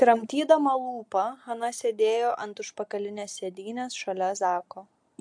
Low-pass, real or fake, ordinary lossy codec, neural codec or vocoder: 9.9 kHz; real; MP3, 64 kbps; none